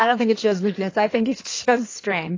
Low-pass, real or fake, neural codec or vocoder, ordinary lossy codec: 7.2 kHz; fake; codec, 16 kHz in and 24 kHz out, 1.1 kbps, FireRedTTS-2 codec; AAC, 32 kbps